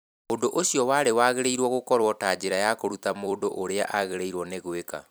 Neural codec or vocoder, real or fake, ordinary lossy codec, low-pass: vocoder, 44.1 kHz, 128 mel bands every 256 samples, BigVGAN v2; fake; none; none